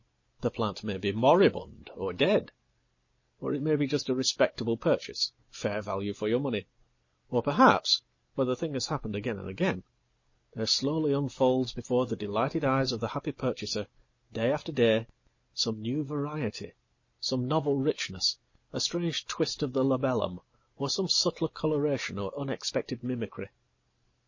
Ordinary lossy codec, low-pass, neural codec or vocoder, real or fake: MP3, 32 kbps; 7.2 kHz; none; real